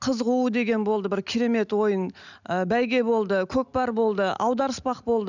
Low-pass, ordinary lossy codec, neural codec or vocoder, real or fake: 7.2 kHz; none; none; real